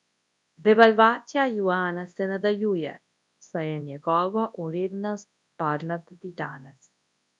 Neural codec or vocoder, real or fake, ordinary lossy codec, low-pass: codec, 24 kHz, 0.9 kbps, WavTokenizer, large speech release; fake; none; 10.8 kHz